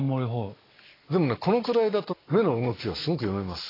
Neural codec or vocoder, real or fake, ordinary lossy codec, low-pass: none; real; AAC, 24 kbps; 5.4 kHz